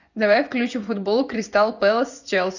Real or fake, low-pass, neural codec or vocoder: fake; 7.2 kHz; vocoder, 24 kHz, 100 mel bands, Vocos